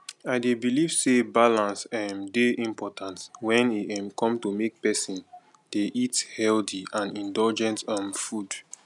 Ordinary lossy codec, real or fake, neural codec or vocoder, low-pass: none; real; none; 10.8 kHz